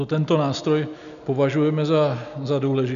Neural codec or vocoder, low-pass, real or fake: none; 7.2 kHz; real